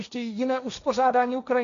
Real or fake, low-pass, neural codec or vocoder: fake; 7.2 kHz; codec, 16 kHz, 1.1 kbps, Voila-Tokenizer